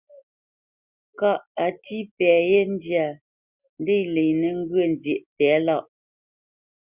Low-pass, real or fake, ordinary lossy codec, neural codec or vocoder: 3.6 kHz; real; Opus, 64 kbps; none